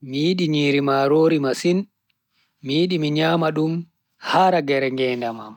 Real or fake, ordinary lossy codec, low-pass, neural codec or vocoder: real; none; 19.8 kHz; none